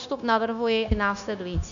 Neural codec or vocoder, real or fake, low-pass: codec, 16 kHz, 0.9 kbps, LongCat-Audio-Codec; fake; 7.2 kHz